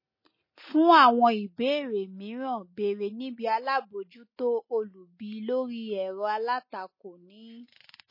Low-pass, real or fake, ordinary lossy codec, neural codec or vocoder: 5.4 kHz; real; MP3, 24 kbps; none